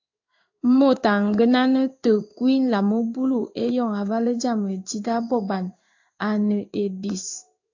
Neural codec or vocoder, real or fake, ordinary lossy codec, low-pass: codec, 16 kHz in and 24 kHz out, 1 kbps, XY-Tokenizer; fake; AAC, 48 kbps; 7.2 kHz